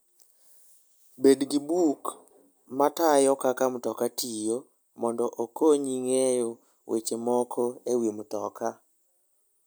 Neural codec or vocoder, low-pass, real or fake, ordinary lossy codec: none; none; real; none